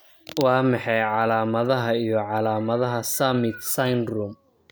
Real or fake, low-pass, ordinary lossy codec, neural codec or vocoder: real; none; none; none